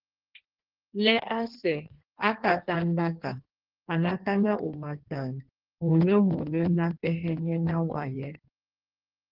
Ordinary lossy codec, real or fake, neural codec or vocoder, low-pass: Opus, 16 kbps; fake; codec, 16 kHz in and 24 kHz out, 1.1 kbps, FireRedTTS-2 codec; 5.4 kHz